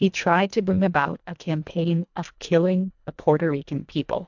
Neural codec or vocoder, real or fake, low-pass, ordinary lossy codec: codec, 24 kHz, 1.5 kbps, HILCodec; fake; 7.2 kHz; MP3, 64 kbps